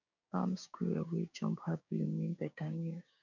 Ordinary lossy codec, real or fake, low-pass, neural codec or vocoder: none; fake; 7.2 kHz; codec, 16 kHz, 6 kbps, DAC